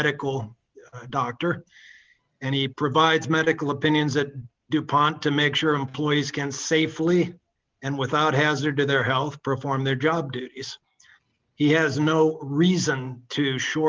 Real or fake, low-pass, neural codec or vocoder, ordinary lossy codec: real; 7.2 kHz; none; Opus, 32 kbps